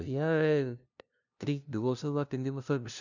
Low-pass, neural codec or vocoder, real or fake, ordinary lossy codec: 7.2 kHz; codec, 16 kHz, 0.5 kbps, FunCodec, trained on LibriTTS, 25 frames a second; fake; none